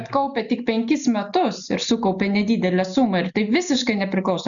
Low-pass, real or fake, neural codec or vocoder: 7.2 kHz; real; none